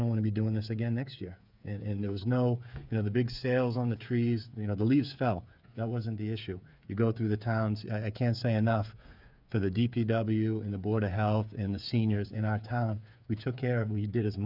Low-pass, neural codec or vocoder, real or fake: 5.4 kHz; codec, 16 kHz, 8 kbps, FreqCodec, smaller model; fake